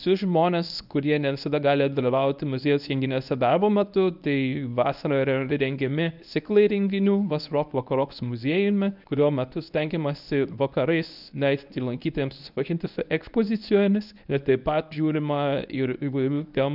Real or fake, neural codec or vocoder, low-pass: fake; codec, 24 kHz, 0.9 kbps, WavTokenizer, medium speech release version 1; 5.4 kHz